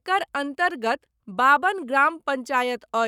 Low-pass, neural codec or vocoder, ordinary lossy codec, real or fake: 19.8 kHz; codec, 44.1 kHz, 7.8 kbps, Pupu-Codec; none; fake